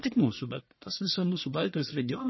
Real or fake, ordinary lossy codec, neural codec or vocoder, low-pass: fake; MP3, 24 kbps; codec, 44.1 kHz, 1.7 kbps, Pupu-Codec; 7.2 kHz